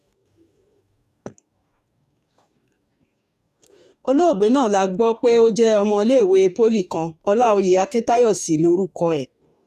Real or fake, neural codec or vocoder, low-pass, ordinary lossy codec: fake; codec, 44.1 kHz, 2.6 kbps, DAC; 14.4 kHz; none